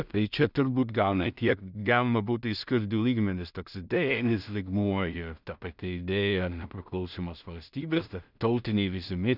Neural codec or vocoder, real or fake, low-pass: codec, 16 kHz in and 24 kHz out, 0.4 kbps, LongCat-Audio-Codec, two codebook decoder; fake; 5.4 kHz